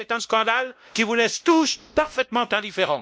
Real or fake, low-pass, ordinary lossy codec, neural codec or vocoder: fake; none; none; codec, 16 kHz, 1 kbps, X-Codec, WavLM features, trained on Multilingual LibriSpeech